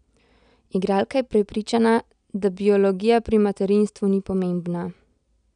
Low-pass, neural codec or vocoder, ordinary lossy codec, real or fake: 9.9 kHz; none; none; real